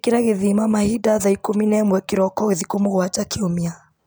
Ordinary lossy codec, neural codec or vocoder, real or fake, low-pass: none; none; real; none